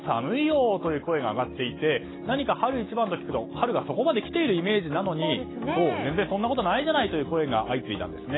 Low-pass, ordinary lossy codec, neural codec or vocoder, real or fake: 7.2 kHz; AAC, 16 kbps; none; real